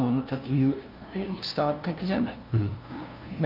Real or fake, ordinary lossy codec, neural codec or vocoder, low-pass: fake; Opus, 24 kbps; codec, 16 kHz, 0.5 kbps, FunCodec, trained on LibriTTS, 25 frames a second; 5.4 kHz